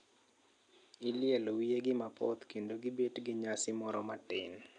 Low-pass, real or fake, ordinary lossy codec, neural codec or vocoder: 9.9 kHz; real; none; none